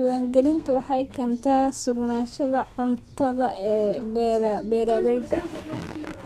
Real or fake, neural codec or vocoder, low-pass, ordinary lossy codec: fake; codec, 32 kHz, 1.9 kbps, SNAC; 14.4 kHz; none